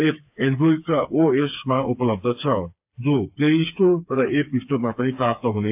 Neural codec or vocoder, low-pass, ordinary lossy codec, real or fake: codec, 16 kHz, 4 kbps, FreqCodec, smaller model; 3.6 kHz; AAC, 32 kbps; fake